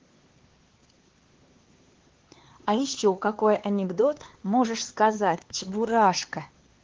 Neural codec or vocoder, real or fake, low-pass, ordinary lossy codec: codec, 16 kHz, 4 kbps, X-Codec, HuBERT features, trained on LibriSpeech; fake; 7.2 kHz; Opus, 16 kbps